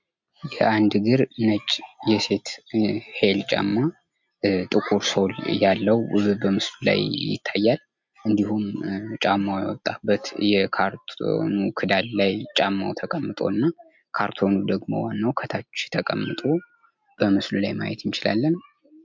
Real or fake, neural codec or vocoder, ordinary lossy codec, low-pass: real; none; MP3, 64 kbps; 7.2 kHz